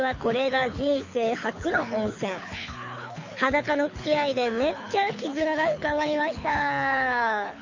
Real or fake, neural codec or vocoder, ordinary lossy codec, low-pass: fake; codec, 24 kHz, 6 kbps, HILCodec; MP3, 48 kbps; 7.2 kHz